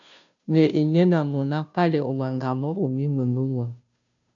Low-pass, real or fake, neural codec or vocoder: 7.2 kHz; fake; codec, 16 kHz, 0.5 kbps, FunCodec, trained on Chinese and English, 25 frames a second